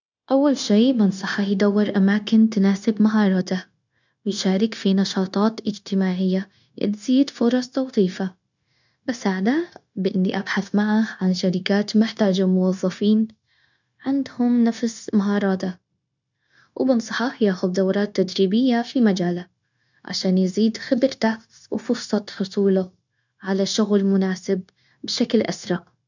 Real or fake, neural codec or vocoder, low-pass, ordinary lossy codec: fake; codec, 16 kHz, 0.9 kbps, LongCat-Audio-Codec; 7.2 kHz; none